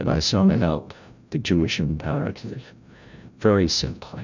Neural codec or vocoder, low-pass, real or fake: codec, 16 kHz, 0.5 kbps, FreqCodec, larger model; 7.2 kHz; fake